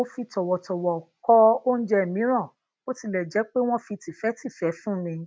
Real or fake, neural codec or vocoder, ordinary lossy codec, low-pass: real; none; none; none